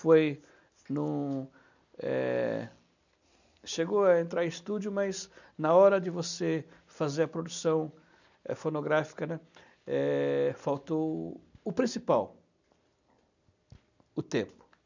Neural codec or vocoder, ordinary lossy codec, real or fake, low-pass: none; none; real; 7.2 kHz